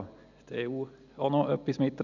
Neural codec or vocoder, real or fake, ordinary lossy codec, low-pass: none; real; none; 7.2 kHz